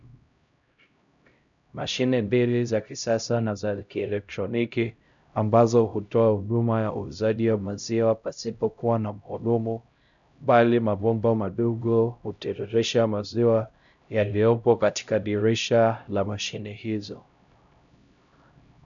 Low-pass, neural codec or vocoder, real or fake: 7.2 kHz; codec, 16 kHz, 0.5 kbps, X-Codec, HuBERT features, trained on LibriSpeech; fake